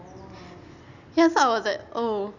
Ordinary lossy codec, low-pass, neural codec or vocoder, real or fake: none; 7.2 kHz; none; real